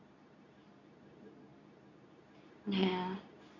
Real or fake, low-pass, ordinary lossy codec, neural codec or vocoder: fake; 7.2 kHz; none; codec, 24 kHz, 0.9 kbps, WavTokenizer, medium speech release version 2